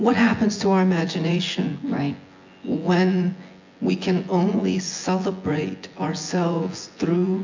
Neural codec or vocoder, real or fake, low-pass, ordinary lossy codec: vocoder, 24 kHz, 100 mel bands, Vocos; fake; 7.2 kHz; MP3, 48 kbps